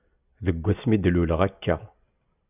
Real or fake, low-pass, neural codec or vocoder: real; 3.6 kHz; none